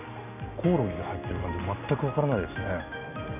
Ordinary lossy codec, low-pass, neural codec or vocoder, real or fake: none; 3.6 kHz; none; real